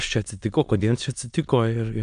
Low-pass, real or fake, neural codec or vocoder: 9.9 kHz; fake; autoencoder, 22.05 kHz, a latent of 192 numbers a frame, VITS, trained on many speakers